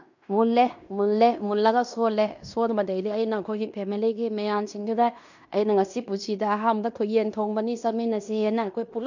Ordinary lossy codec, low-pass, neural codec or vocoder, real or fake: none; 7.2 kHz; codec, 16 kHz in and 24 kHz out, 0.9 kbps, LongCat-Audio-Codec, fine tuned four codebook decoder; fake